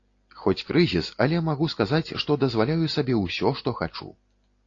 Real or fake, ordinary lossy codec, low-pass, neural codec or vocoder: real; AAC, 32 kbps; 7.2 kHz; none